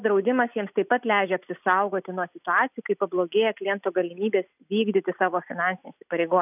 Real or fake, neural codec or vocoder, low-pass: real; none; 3.6 kHz